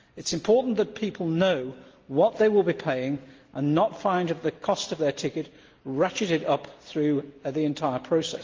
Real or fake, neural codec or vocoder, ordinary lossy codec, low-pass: real; none; Opus, 24 kbps; 7.2 kHz